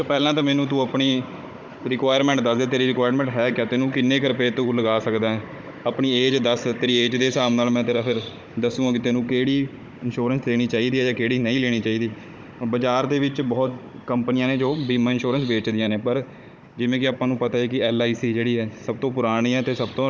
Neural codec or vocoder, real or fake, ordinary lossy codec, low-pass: codec, 16 kHz, 16 kbps, FunCodec, trained on Chinese and English, 50 frames a second; fake; none; none